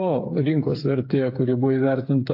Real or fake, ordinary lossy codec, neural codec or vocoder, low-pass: fake; AAC, 32 kbps; codec, 16 kHz, 8 kbps, FreqCodec, smaller model; 5.4 kHz